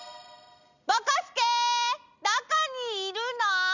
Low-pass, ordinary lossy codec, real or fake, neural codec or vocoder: 7.2 kHz; none; real; none